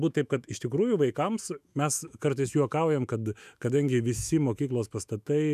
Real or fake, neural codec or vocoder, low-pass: fake; autoencoder, 48 kHz, 128 numbers a frame, DAC-VAE, trained on Japanese speech; 14.4 kHz